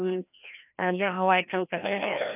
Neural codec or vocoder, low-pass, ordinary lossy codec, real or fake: codec, 16 kHz, 1 kbps, FreqCodec, larger model; 3.6 kHz; none; fake